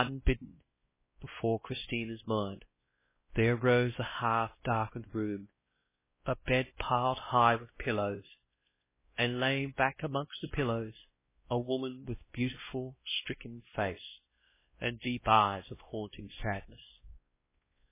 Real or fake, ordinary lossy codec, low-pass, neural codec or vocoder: fake; MP3, 16 kbps; 3.6 kHz; codec, 16 kHz, 1 kbps, X-Codec, WavLM features, trained on Multilingual LibriSpeech